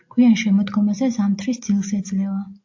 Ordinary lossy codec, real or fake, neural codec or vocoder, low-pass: AAC, 48 kbps; real; none; 7.2 kHz